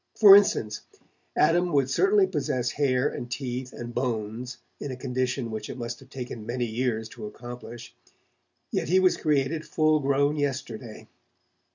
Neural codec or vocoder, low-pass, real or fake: none; 7.2 kHz; real